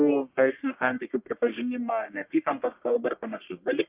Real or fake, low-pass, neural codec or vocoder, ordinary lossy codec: fake; 3.6 kHz; codec, 44.1 kHz, 1.7 kbps, Pupu-Codec; Opus, 24 kbps